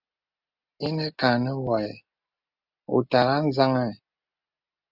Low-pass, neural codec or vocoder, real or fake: 5.4 kHz; none; real